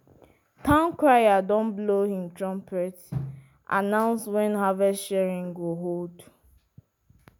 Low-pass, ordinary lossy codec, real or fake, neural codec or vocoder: none; none; real; none